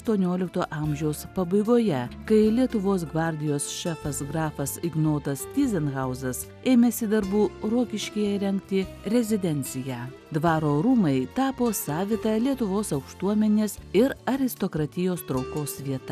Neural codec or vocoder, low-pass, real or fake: none; 14.4 kHz; real